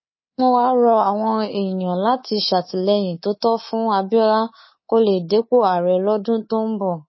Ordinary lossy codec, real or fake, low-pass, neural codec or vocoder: MP3, 24 kbps; fake; 7.2 kHz; codec, 24 kHz, 3.1 kbps, DualCodec